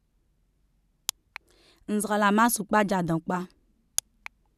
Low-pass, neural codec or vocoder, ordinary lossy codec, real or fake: 14.4 kHz; vocoder, 44.1 kHz, 128 mel bands every 512 samples, BigVGAN v2; none; fake